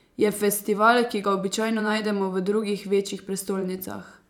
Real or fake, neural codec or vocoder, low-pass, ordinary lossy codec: fake; vocoder, 44.1 kHz, 128 mel bands every 512 samples, BigVGAN v2; 19.8 kHz; none